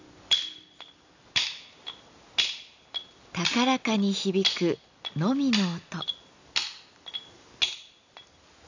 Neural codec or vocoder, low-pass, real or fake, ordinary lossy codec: none; 7.2 kHz; real; AAC, 48 kbps